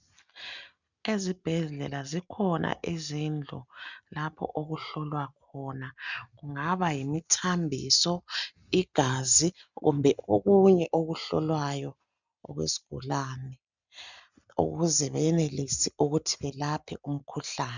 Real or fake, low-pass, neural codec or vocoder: real; 7.2 kHz; none